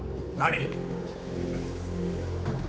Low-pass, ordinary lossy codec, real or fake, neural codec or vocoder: none; none; fake; codec, 16 kHz, 4 kbps, X-Codec, HuBERT features, trained on general audio